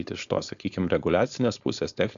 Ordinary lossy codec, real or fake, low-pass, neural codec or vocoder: AAC, 96 kbps; fake; 7.2 kHz; codec, 16 kHz, 4.8 kbps, FACodec